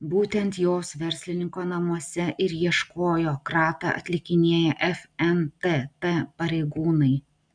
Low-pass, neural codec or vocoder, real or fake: 9.9 kHz; none; real